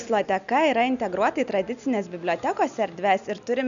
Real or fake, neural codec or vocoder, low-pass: real; none; 7.2 kHz